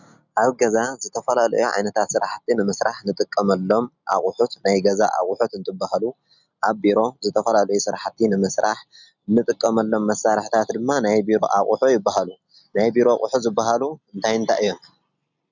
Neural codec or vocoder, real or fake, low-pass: none; real; 7.2 kHz